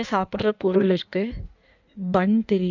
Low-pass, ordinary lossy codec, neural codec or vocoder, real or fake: 7.2 kHz; none; codec, 16 kHz in and 24 kHz out, 1.1 kbps, FireRedTTS-2 codec; fake